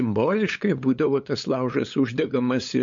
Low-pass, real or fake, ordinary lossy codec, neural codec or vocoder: 7.2 kHz; fake; MP3, 48 kbps; codec, 16 kHz, 8 kbps, FunCodec, trained on LibriTTS, 25 frames a second